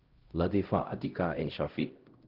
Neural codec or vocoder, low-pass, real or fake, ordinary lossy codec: codec, 16 kHz, 0.5 kbps, X-Codec, HuBERT features, trained on LibriSpeech; 5.4 kHz; fake; Opus, 16 kbps